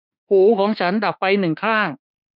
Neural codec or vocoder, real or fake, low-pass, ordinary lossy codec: autoencoder, 48 kHz, 32 numbers a frame, DAC-VAE, trained on Japanese speech; fake; 5.4 kHz; none